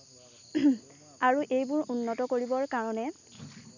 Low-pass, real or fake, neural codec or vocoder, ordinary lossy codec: 7.2 kHz; real; none; none